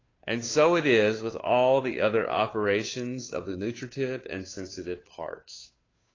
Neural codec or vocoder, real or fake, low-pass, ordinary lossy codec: codec, 16 kHz, 2 kbps, FunCodec, trained on Chinese and English, 25 frames a second; fake; 7.2 kHz; AAC, 32 kbps